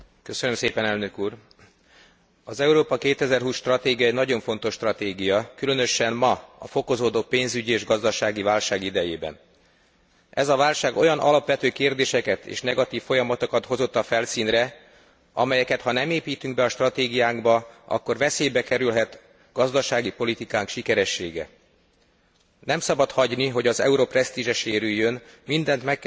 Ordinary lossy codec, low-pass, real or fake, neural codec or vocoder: none; none; real; none